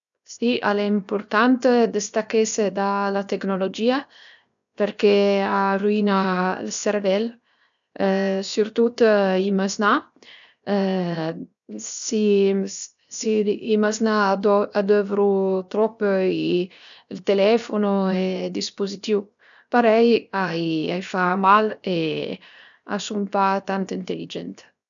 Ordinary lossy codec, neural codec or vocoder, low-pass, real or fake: none; codec, 16 kHz, 0.7 kbps, FocalCodec; 7.2 kHz; fake